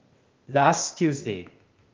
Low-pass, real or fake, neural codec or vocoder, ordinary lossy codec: 7.2 kHz; fake; codec, 16 kHz, 0.8 kbps, ZipCodec; Opus, 32 kbps